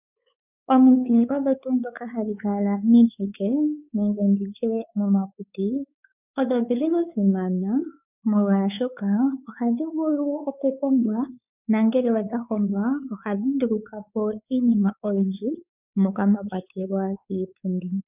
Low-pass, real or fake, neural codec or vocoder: 3.6 kHz; fake; codec, 16 kHz, 4 kbps, X-Codec, WavLM features, trained on Multilingual LibriSpeech